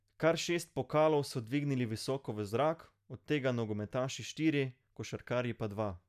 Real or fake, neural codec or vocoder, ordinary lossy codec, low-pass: real; none; none; 14.4 kHz